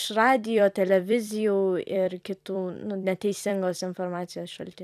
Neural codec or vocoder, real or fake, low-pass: vocoder, 44.1 kHz, 128 mel bands every 256 samples, BigVGAN v2; fake; 14.4 kHz